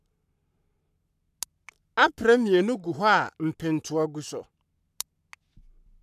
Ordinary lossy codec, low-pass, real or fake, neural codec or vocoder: none; 14.4 kHz; fake; codec, 44.1 kHz, 7.8 kbps, Pupu-Codec